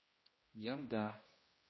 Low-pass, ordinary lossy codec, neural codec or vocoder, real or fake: 7.2 kHz; MP3, 24 kbps; codec, 16 kHz, 1 kbps, X-Codec, HuBERT features, trained on general audio; fake